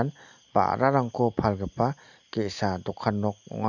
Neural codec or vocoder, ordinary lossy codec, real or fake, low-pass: none; none; real; 7.2 kHz